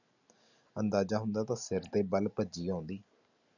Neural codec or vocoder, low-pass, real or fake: none; 7.2 kHz; real